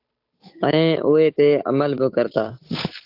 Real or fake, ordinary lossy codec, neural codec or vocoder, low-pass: fake; AAC, 32 kbps; codec, 16 kHz, 8 kbps, FunCodec, trained on Chinese and English, 25 frames a second; 5.4 kHz